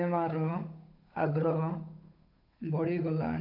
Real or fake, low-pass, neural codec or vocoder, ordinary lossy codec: fake; 5.4 kHz; codec, 16 kHz, 8 kbps, FunCodec, trained on Chinese and English, 25 frames a second; none